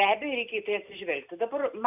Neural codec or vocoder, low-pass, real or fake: none; 3.6 kHz; real